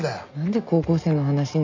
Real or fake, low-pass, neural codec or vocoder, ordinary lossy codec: real; 7.2 kHz; none; MP3, 64 kbps